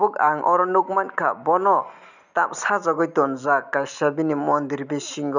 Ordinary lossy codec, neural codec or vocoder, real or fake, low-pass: none; none; real; 7.2 kHz